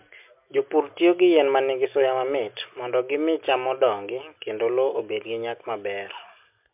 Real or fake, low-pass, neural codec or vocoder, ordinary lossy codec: real; 3.6 kHz; none; MP3, 32 kbps